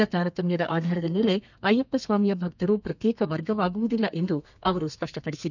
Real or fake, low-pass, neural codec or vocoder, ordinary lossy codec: fake; 7.2 kHz; codec, 32 kHz, 1.9 kbps, SNAC; none